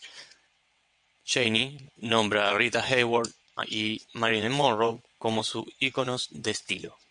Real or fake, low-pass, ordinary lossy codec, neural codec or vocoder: fake; 9.9 kHz; MP3, 64 kbps; vocoder, 22.05 kHz, 80 mel bands, WaveNeXt